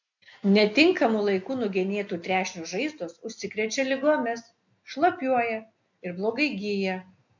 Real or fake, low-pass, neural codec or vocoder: real; 7.2 kHz; none